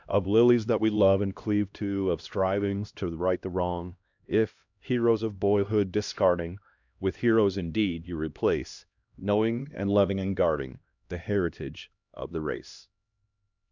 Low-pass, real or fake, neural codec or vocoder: 7.2 kHz; fake; codec, 16 kHz, 1 kbps, X-Codec, HuBERT features, trained on LibriSpeech